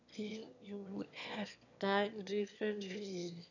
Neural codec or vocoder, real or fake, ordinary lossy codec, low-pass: autoencoder, 22.05 kHz, a latent of 192 numbers a frame, VITS, trained on one speaker; fake; none; 7.2 kHz